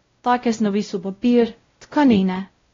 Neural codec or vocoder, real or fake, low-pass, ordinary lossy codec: codec, 16 kHz, 0.5 kbps, X-Codec, WavLM features, trained on Multilingual LibriSpeech; fake; 7.2 kHz; AAC, 32 kbps